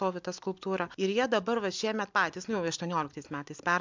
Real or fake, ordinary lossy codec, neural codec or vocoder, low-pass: real; MP3, 64 kbps; none; 7.2 kHz